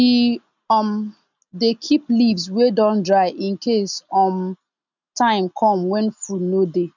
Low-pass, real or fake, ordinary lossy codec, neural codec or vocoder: 7.2 kHz; real; none; none